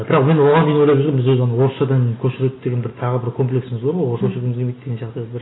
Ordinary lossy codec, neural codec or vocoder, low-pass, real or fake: AAC, 16 kbps; none; 7.2 kHz; real